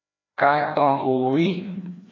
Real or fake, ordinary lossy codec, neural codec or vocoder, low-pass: fake; AAC, 32 kbps; codec, 16 kHz, 1 kbps, FreqCodec, larger model; 7.2 kHz